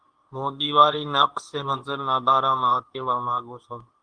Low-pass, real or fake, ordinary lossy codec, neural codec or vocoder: 9.9 kHz; fake; Opus, 24 kbps; codec, 24 kHz, 0.9 kbps, WavTokenizer, medium speech release version 2